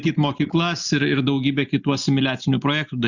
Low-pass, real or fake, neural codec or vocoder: 7.2 kHz; real; none